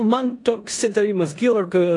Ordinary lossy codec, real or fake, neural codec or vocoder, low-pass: AAC, 48 kbps; fake; codec, 16 kHz in and 24 kHz out, 0.9 kbps, LongCat-Audio-Codec, four codebook decoder; 10.8 kHz